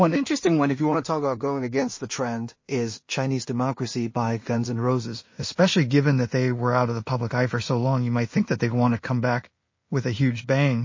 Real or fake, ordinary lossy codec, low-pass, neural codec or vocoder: fake; MP3, 32 kbps; 7.2 kHz; codec, 16 kHz in and 24 kHz out, 0.4 kbps, LongCat-Audio-Codec, two codebook decoder